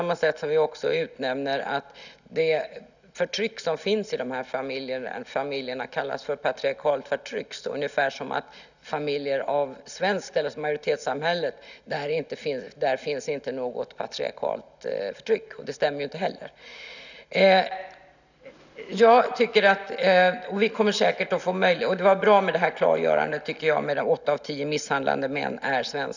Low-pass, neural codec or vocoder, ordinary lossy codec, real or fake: 7.2 kHz; none; none; real